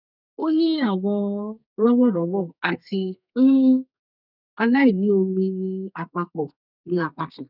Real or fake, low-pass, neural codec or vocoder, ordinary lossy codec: fake; 5.4 kHz; codec, 32 kHz, 1.9 kbps, SNAC; none